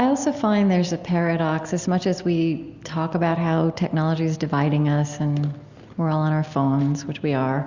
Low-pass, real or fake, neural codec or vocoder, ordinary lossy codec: 7.2 kHz; real; none; Opus, 64 kbps